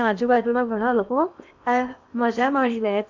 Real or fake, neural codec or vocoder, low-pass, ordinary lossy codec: fake; codec, 16 kHz in and 24 kHz out, 0.8 kbps, FocalCodec, streaming, 65536 codes; 7.2 kHz; none